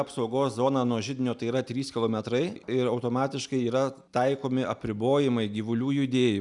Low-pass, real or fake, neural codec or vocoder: 10.8 kHz; real; none